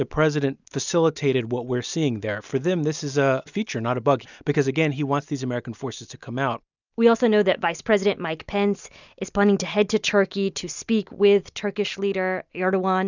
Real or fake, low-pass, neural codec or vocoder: real; 7.2 kHz; none